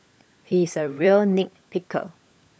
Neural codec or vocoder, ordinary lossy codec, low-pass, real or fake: codec, 16 kHz, 16 kbps, FunCodec, trained on LibriTTS, 50 frames a second; none; none; fake